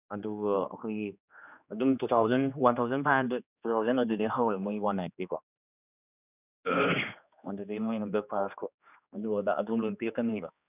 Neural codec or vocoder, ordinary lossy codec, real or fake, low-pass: codec, 16 kHz, 2 kbps, X-Codec, HuBERT features, trained on general audio; none; fake; 3.6 kHz